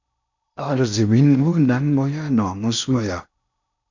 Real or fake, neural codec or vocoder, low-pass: fake; codec, 16 kHz in and 24 kHz out, 0.6 kbps, FocalCodec, streaming, 4096 codes; 7.2 kHz